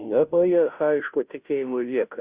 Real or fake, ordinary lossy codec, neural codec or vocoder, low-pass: fake; Opus, 64 kbps; codec, 16 kHz, 0.5 kbps, FunCodec, trained on Chinese and English, 25 frames a second; 3.6 kHz